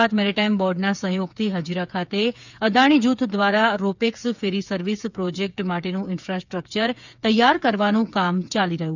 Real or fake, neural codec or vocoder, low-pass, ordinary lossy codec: fake; codec, 16 kHz, 8 kbps, FreqCodec, smaller model; 7.2 kHz; none